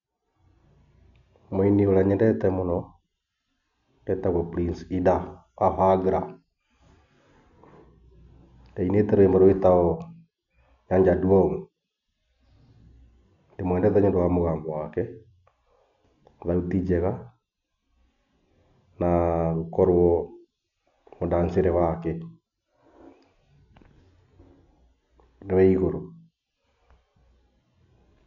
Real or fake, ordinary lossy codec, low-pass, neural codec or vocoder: real; none; 7.2 kHz; none